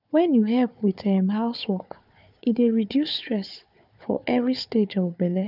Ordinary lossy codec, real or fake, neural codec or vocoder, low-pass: none; fake; codec, 16 kHz, 4 kbps, FunCodec, trained on Chinese and English, 50 frames a second; 5.4 kHz